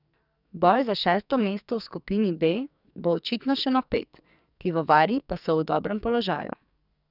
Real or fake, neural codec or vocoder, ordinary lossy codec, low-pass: fake; codec, 44.1 kHz, 2.6 kbps, SNAC; none; 5.4 kHz